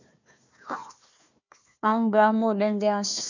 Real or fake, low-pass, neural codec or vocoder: fake; 7.2 kHz; codec, 16 kHz, 1 kbps, FunCodec, trained on Chinese and English, 50 frames a second